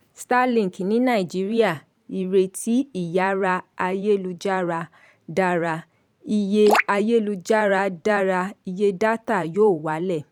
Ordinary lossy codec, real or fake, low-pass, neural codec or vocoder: none; fake; 19.8 kHz; vocoder, 44.1 kHz, 128 mel bands every 512 samples, BigVGAN v2